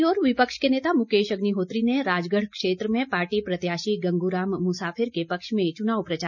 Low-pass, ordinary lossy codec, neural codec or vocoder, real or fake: 7.2 kHz; none; none; real